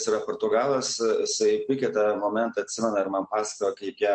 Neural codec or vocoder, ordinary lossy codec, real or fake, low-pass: none; MP3, 48 kbps; real; 9.9 kHz